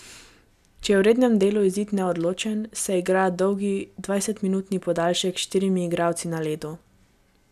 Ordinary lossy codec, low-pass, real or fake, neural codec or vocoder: none; 14.4 kHz; real; none